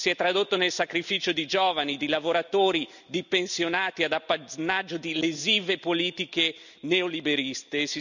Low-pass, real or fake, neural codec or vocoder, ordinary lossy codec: 7.2 kHz; real; none; none